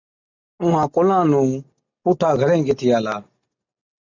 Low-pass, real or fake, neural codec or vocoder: 7.2 kHz; real; none